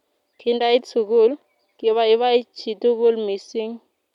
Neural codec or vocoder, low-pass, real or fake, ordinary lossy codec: none; 19.8 kHz; real; none